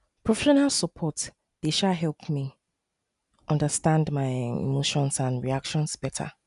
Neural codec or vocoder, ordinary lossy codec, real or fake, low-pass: none; none; real; 10.8 kHz